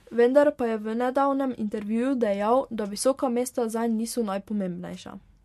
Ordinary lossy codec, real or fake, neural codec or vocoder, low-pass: MP3, 64 kbps; real; none; 14.4 kHz